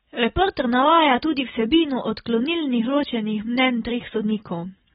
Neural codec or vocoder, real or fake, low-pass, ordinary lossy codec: none; real; 9.9 kHz; AAC, 16 kbps